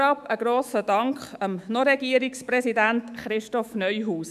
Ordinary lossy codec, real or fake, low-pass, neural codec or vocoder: none; fake; 14.4 kHz; autoencoder, 48 kHz, 128 numbers a frame, DAC-VAE, trained on Japanese speech